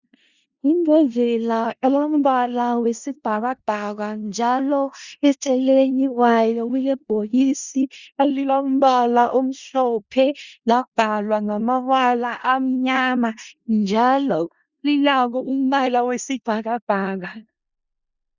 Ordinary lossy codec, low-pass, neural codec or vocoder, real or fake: Opus, 64 kbps; 7.2 kHz; codec, 16 kHz in and 24 kHz out, 0.4 kbps, LongCat-Audio-Codec, four codebook decoder; fake